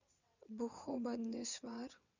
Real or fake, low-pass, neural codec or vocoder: fake; 7.2 kHz; vocoder, 22.05 kHz, 80 mel bands, WaveNeXt